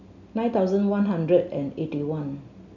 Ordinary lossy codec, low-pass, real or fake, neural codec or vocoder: none; 7.2 kHz; real; none